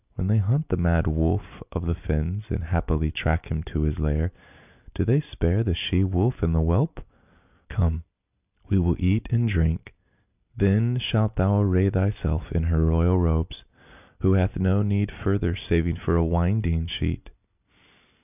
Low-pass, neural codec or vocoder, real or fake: 3.6 kHz; none; real